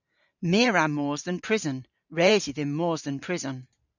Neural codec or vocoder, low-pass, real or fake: vocoder, 44.1 kHz, 128 mel bands every 512 samples, BigVGAN v2; 7.2 kHz; fake